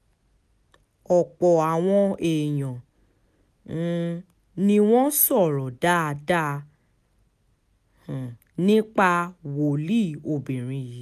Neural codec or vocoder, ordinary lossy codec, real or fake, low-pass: none; none; real; 14.4 kHz